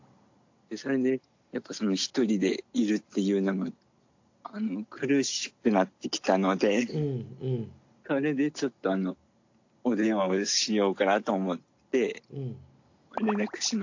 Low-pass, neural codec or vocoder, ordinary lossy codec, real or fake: 7.2 kHz; none; AAC, 48 kbps; real